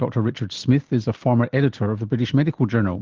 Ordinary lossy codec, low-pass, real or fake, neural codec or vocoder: Opus, 16 kbps; 7.2 kHz; real; none